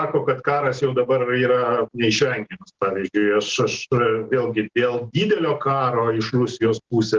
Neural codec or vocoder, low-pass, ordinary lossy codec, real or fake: none; 7.2 kHz; Opus, 16 kbps; real